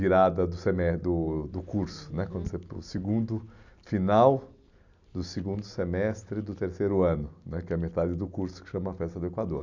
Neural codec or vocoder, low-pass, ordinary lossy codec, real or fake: none; 7.2 kHz; none; real